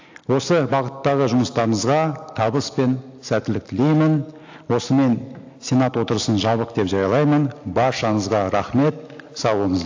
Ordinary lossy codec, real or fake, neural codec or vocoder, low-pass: AAC, 48 kbps; real; none; 7.2 kHz